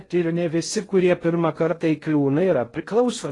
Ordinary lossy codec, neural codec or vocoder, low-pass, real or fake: AAC, 32 kbps; codec, 16 kHz in and 24 kHz out, 0.6 kbps, FocalCodec, streaming, 2048 codes; 10.8 kHz; fake